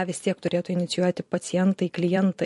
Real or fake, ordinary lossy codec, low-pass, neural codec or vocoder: fake; MP3, 48 kbps; 14.4 kHz; vocoder, 44.1 kHz, 128 mel bands every 256 samples, BigVGAN v2